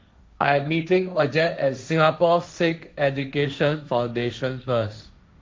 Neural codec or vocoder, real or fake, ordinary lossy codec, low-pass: codec, 16 kHz, 1.1 kbps, Voila-Tokenizer; fake; none; none